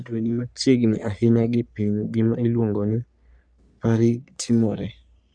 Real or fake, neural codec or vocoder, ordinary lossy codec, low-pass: fake; codec, 44.1 kHz, 3.4 kbps, Pupu-Codec; none; 9.9 kHz